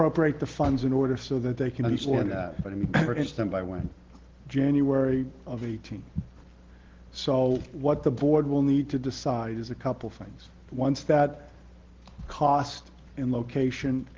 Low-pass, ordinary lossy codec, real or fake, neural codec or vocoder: 7.2 kHz; Opus, 16 kbps; real; none